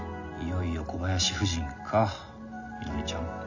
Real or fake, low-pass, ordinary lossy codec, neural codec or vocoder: real; 7.2 kHz; none; none